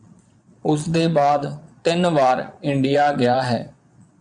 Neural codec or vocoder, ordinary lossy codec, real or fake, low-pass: vocoder, 22.05 kHz, 80 mel bands, Vocos; MP3, 96 kbps; fake; 9.9 kHz